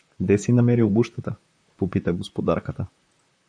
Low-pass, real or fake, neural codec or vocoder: 9.9 kHz; fake; vocoder, 44.1 kHz, 128 mel bands, Pupu-Vocoder